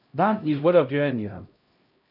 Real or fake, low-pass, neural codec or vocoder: fake; 5.4 kHz; codec, 16 kHz, 0.5 kbps, X-Codec, HuBERT features, trained on LibriSpeech